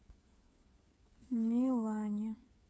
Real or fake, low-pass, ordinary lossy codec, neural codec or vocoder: fake; none; none; codec, 16 kHz, 8 kbps, FreqCodec, smaller model